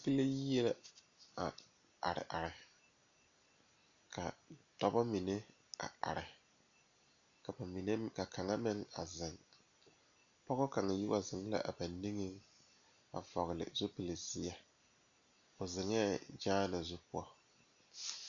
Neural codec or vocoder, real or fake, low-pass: none; real; 7.2 kHz